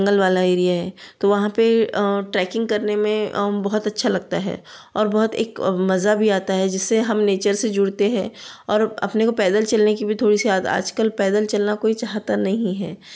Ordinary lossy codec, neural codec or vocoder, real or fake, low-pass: none; none; real; none